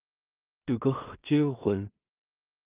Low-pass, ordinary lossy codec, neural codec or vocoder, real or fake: 3.6 kHz; Opus, 24 kbps; codec, 16 kHz in and 24 kHz out, 0.4 kbps, LongCat-Audio-Codec, two codebook decoder; fake